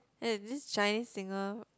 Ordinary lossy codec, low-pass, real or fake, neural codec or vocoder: none; none; real; none